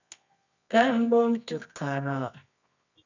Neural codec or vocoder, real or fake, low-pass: codec, 24 kHz, 0.9 kbps, WavTokenizer, medium music audio release; fake; 7.2 kHz